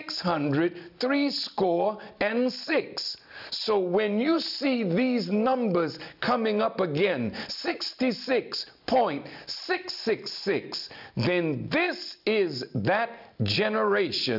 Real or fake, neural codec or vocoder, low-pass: real; none; 5.4 kHz